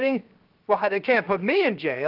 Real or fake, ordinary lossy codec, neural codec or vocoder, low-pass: fake; Opus, 24 kbps; codec, 16 kHz, 0.7 kbps, FocalCodec; 5.4 kHz